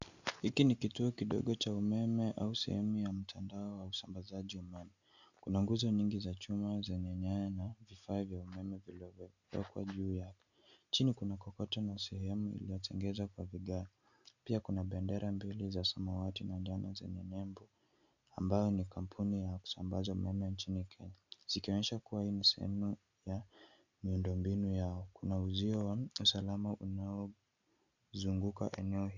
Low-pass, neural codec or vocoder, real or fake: 7.2 kHz; none; real